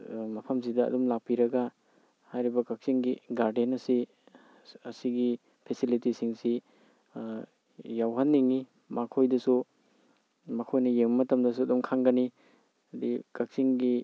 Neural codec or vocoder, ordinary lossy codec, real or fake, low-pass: none; none; real; none